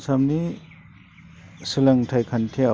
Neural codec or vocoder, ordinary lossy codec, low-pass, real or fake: none; none; none; real